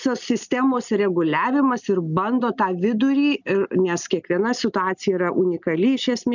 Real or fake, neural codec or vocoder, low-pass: real; none; 7.2 kHz